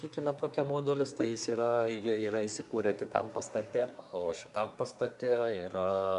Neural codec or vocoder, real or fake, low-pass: codec, 24 kHz, 1 kbps, SNAC; fake; 10.8 kHz